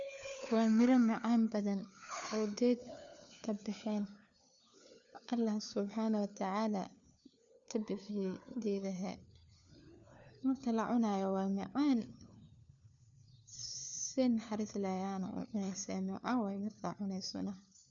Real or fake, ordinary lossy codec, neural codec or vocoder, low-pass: fake; none; codec, 16 kHz, 4 kbps, FunCodec, trained on LibriTTS, 50 frames a second; 7.2 kHz